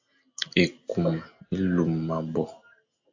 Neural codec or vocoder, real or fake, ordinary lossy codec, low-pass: none; real; AAC, 48 kbps; 7.2 kHz